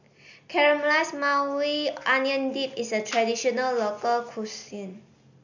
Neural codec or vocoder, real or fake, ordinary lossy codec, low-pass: none; real; none; 7.2 kHz